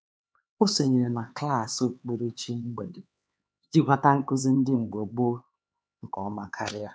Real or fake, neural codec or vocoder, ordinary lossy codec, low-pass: fake; codec, 16 kHz, 4 kbps, X-Codec, HuBERT features, trained on LibriSpeech; none; none